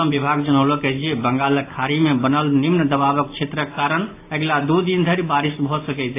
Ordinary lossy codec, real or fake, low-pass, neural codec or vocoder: AAC, 24 kbps; real; 3.6 kHz; none